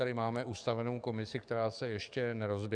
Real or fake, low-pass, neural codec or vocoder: fake; 9.9 kHz; codec, 44.1 kHz, 7.8 kbps, DAC